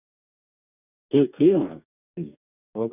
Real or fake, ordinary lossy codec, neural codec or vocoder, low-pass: fake; none; codec, 44.1 kHz, 2.6 kbps, DAC; 3.6 kHz